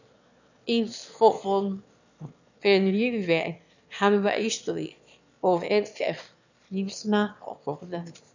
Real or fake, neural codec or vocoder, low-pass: fake; autoencoder, 22.05 kHz, a latent of 192 numbers a frame, VITS, trained on one speaker; 7.2 kHz